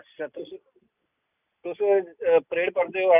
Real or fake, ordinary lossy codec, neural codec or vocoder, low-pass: real; none; none; 3.6 kHz